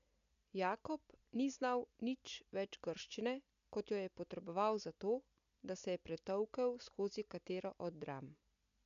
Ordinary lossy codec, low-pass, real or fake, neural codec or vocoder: MP3, 64 kbps; 7.2 kHz; real; none